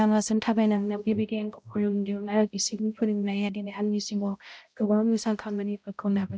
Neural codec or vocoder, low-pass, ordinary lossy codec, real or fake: codec, 16 kHz, 0.5 kbps, X-Codec, HuBERT features, trained on balanced general audio; none; none; fake